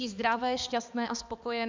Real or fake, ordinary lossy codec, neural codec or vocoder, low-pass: fake; MP3, 64 kbps; codec, 16 kHz, 2 kbps, X-Codec, HuBERT features, trained on balanced general audio; 7.2 kHz